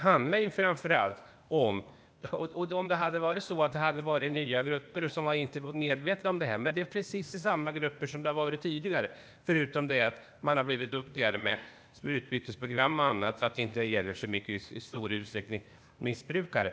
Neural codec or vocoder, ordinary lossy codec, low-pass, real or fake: codec, 16 kHz, 0.8 kbps, ZipCodec; none; none; fake